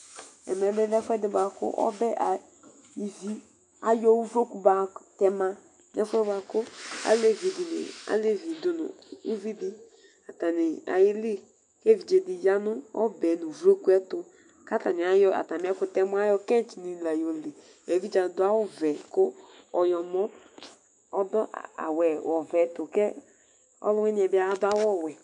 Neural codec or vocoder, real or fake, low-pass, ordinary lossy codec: autoencoder, 48 kHz, 128 numbers a frame, DAC-VAE, trained on Japanese speech; fake; 10.8 kHz; MP3, 96 kbps